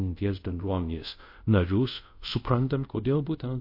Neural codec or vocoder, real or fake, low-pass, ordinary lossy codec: codec, 24 kHz, 0.5 kbps, DualCodec; fake; 5.4 kHz; MP3, 32 kbps